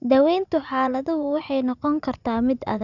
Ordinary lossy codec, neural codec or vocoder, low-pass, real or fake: none; vocoder, 44.1 kHz, 80 mel bands, Vocos; 7.2 kHz; fake